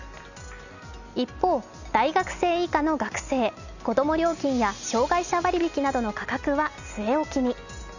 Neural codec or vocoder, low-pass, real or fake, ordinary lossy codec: none; 7.2 kHz; real; none